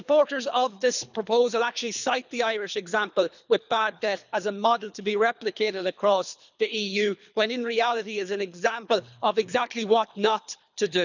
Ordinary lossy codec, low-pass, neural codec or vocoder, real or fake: none; 7.2 kHz; codec, 24 kHz, 3 kbps, HILCodec; fake